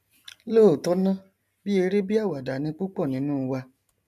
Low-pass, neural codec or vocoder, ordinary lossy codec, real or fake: 14.4 kHz; none; none; real